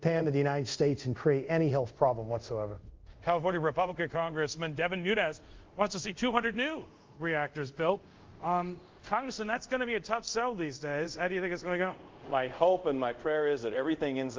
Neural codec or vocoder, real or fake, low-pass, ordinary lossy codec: codec, 24 kHz, 0.5 kbps, DualCodec; fake; 7.2 kHz; Opus, 32 kbps